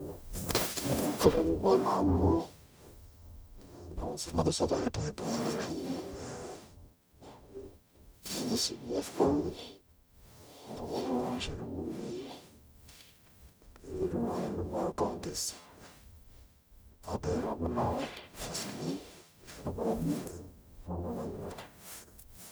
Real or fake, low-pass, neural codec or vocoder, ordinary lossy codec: fake; none; codec, 44.1 kHz, 0.9 kbps, DAC; none